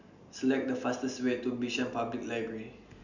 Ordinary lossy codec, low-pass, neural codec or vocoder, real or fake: none; 7.2 kHz; none; real